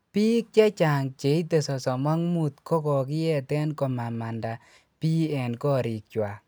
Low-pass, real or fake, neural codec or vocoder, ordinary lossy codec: none; real; none; none